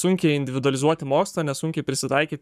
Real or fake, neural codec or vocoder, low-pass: fake; codec, 44.1 kHz, 7.8 kbps, Pupu-Codec; 14.4 kHz